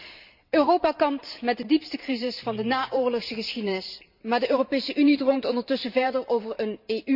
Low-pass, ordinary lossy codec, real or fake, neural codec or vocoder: 5.4 kHz; none; fake; vocoder, 44.1 kHz, 128 mel bands every 512 samples, BigVGAN v2